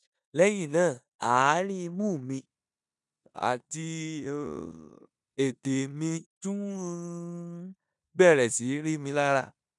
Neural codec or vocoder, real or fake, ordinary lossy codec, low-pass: codec, 16 kHz in and 24 kHz out, 0.9 kbps, LongCat-Audio-Codec, four codebook decoder; fake; none; 10.8 kHz